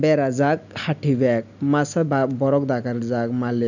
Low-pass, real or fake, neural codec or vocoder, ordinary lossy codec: 7.2 kHz; real; none; none